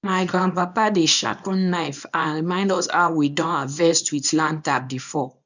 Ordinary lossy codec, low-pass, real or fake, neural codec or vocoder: none; 7.2 kHz; fake; codec, 24 kHz, 0.9 kbps, WavTokenizer, small release